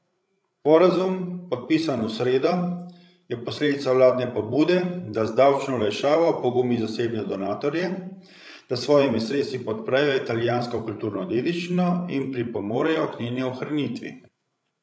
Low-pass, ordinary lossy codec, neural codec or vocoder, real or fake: none; none; codec, 16 kHz, 16 kbps, FreqCodec, larger model; fake